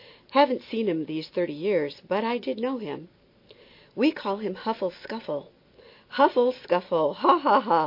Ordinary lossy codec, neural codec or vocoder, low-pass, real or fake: MP3, 32 kbps; none; 5.4 kHz; real